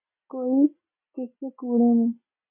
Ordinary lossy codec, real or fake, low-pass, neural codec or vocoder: MP3, 32 kbps; real; 3.6 kHz; none